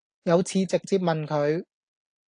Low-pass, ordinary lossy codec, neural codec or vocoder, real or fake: 9.9 kHz; AAC, 64 kbps; none; real